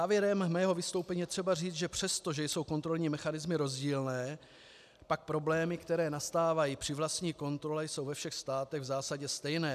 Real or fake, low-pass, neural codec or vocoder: real; 14.4 kHz; none